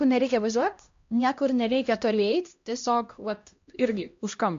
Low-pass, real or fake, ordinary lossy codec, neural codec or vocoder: 7.2 kHz; fake; MP3, 48 kbps; codec, 16 kHz, 1 kbps, X-Codec, HuBERT features, trained on LibriSpeech